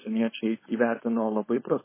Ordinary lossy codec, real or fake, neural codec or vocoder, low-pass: MP3, 16 kbps; fake; codec, 16 kHz, 4.8 kbps, FACodec; 3.6 kHz